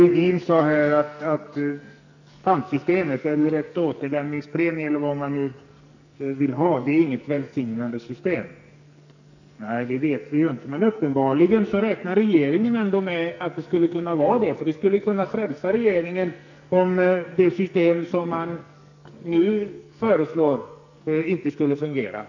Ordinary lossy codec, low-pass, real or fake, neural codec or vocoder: none; 7.2 kHz; fake; codec, 44.1 kHz, 2.6 kbps, SNAC